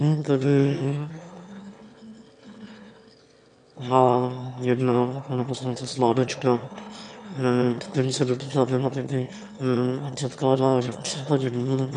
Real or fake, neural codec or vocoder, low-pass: fake; autoencoder, 22.05 kHz, a latent of 192 numbers a frame, VITS, trained on one speaker; 9.9 kHz